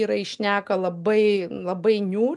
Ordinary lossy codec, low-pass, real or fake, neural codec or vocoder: MP3, 96 kbps; 10.8 kHz; real; none